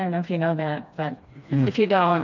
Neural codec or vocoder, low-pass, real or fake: codec, 16 kHz, 2 kbps, FreqCodec, smaller model; 7.2 kHz; fake